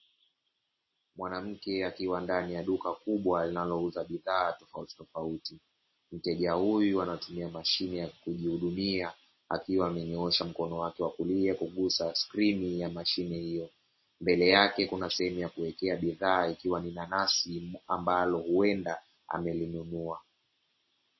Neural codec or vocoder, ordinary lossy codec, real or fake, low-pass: none; MP3, 24 kbps; real; 7.2 kHz